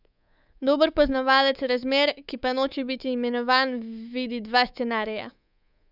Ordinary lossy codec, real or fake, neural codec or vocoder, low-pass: none; fake; codec, 24 kHz, 3.1 kbps, DualCodec; 5.4 kHz